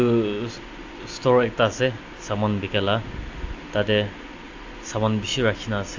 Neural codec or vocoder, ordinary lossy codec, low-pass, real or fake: none; AAC, 48 kbps; 7.2 kHz; real